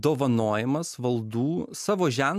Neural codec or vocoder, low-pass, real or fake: none; 14.4 kHz; real